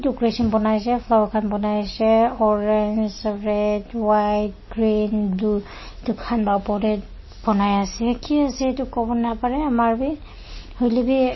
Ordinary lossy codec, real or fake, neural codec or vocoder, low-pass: MP3, 24 kbps; real; none; 7.2 kHz